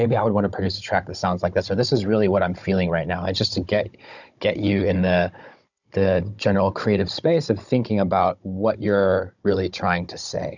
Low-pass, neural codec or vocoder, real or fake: 7.2 kHz; codec, 16 kHz, 16 kbps, FunCodec, trained on Chinese and English, 50 frames a second; fake